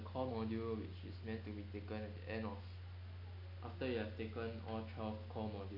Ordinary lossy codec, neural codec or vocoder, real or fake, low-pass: none; none; real; 5.4 kHz